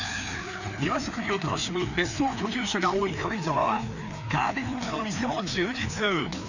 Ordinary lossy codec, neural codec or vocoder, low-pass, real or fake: none; codec, 16 kHz, 2 kbps, FreqCodec, larger model; 7.2 kHz; fake